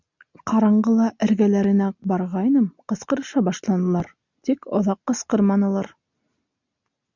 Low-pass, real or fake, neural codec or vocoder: 7.2 kHz; real; none